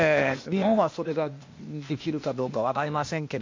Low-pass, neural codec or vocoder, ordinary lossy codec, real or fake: 7.2 kHz; codec, 16 kHz, 0.8 kbps, ZipCodec; MP3, 48 kbps; fake